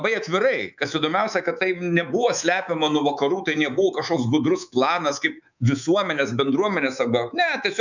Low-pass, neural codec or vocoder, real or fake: 7.2 kHz; codec, 24 kHz, 3.1 kbps, DualCodec; fake